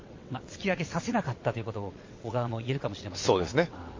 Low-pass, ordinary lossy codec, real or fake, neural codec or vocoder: 7.2 kHz; MP3, 32 kbps; fake; vocoder, 22.05 kHz, 80 mel bands, WaveNeXt